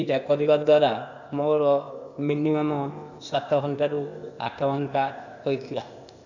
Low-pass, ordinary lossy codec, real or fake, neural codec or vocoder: 7.2 kHz; none; fake; codec, 16 kHz, 0.8 kbps, ZipCodec